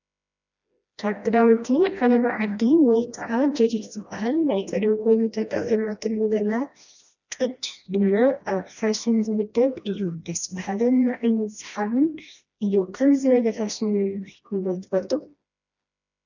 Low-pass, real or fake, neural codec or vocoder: 7.2 kHz; fake; codec, 16 kHz, 1 kbps, FreqCodec, smaller model